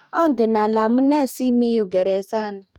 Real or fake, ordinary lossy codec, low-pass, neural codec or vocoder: fake; none; 19.8 kHz; codec, 44.1 kHz, 2.6 kbps, DAC